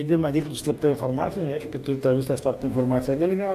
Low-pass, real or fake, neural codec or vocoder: 14.4 kHz; fake; codec, 44.1 kHz, 2.6 kbps, DAC